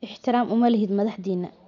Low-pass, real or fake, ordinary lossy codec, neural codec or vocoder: 7.2 kHz; real; none; none